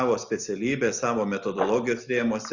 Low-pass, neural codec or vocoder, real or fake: 7.2 kHz; none; real